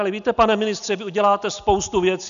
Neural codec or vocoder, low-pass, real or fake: none; 7.2 kHz; real